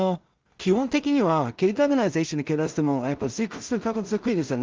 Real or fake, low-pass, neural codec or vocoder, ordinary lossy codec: fake; 7.2 kHz; codec, 16 kHz in and 24 kHz out, 0.4 kbps, LongCat-Audio-Codec, two codebook decoder; Opus, 32 kbps